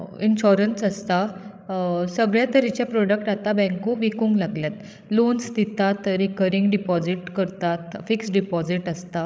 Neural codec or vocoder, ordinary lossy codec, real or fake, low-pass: codec, 16 kHz, 16 kbps, FreqCodec, larger model; none; fake; none